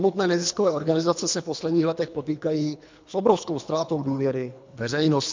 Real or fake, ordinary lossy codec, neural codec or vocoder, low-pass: fake; MP3, 64 kbps; codec, 24 kHz, 3 kbps, HILCodec; 7.2 kHz